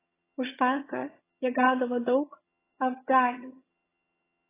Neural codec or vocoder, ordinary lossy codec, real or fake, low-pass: vocoder, 22.05 kHz, 80 mel bands, HiFi-GAN; AAC, 16 kbps; fake; 3.6 kHz